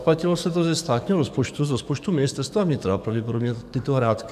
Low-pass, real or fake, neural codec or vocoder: 14.4 kHz; fake; codec, 44.1 kHz, 7.8 kbps, DAC